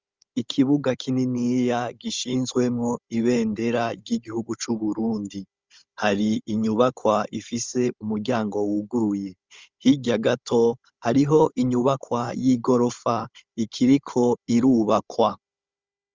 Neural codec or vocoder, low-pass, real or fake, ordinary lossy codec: codec, 16 kHz, 16 kbps, FunCodec, trained on Chinese and English, 50 frames a second; 7.2 kHz; fake; Opus, 32 kbps